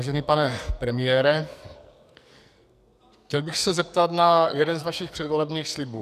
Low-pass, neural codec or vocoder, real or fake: 14.4 kHz; codec, 44.1 kHz, 2.6 kbps, SNAC; fake